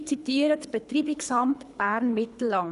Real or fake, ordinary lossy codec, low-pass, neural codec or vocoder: fake; none; 10.8 kHz; codec, 24 kHz, 3 kbps, HILCodec